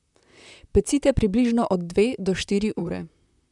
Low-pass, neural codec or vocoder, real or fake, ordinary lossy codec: 10.8 kHz; vocoder, 44.1 kHz, 128 mel bands, Pupu-Vocoder; fake; none